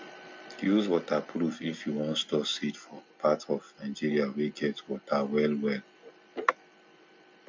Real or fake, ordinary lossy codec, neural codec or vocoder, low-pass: real; none; none; none